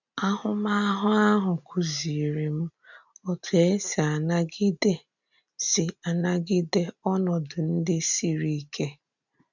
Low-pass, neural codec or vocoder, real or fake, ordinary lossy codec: 7.2 kHz; none; real; none